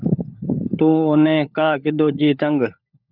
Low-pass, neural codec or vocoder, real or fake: 5.4 kHz; codec, 16 kHz, 16 kbps, FunCodec, trained on LibriTTS, 50 frames a second; fake